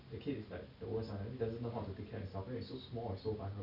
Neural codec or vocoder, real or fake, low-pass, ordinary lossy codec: none; real; 5.4 kHz; none